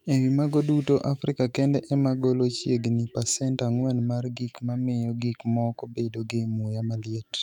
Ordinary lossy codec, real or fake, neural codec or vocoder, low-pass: none; fake; autoencoder, 48 kHz, 128 numbers a frame, DAC-VAE, trained on Japanese speech; 19.8 kHz